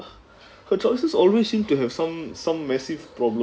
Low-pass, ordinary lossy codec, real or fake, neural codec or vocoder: none; none; real; none